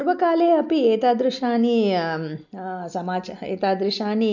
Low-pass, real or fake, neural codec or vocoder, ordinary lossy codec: 7.2 kHz; real; none; none